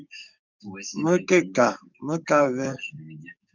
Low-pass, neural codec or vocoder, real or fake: 7.2 kHz; codec, 44.1 kHz, 7.8 kbps, DAC; fake